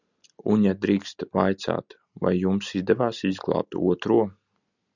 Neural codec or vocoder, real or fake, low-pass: none; real; 7.2 kHz